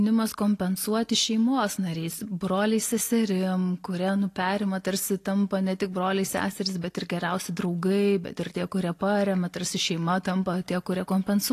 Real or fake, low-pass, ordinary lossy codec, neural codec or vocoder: real; 14.4 kHz; AAC, 48 kbps; none